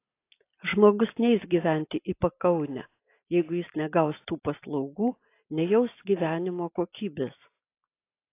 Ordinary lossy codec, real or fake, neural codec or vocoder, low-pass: AAC, 24 kbps; real; none; 3.6 kHz